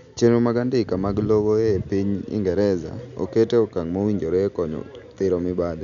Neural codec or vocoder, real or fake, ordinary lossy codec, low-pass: none; real; none; 7.2 kHz